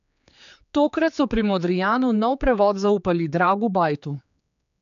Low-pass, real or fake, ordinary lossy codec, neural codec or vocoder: 7.2 kHz; fake; none; codec, 16 kHz, 4 kbps, X-Codec, HuBERT features, trained on general audio